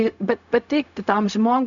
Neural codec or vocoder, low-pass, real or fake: codec, 16 kHz, 0.4 kbps, LongCat-Audio-Codec; 7.2 kHz; fake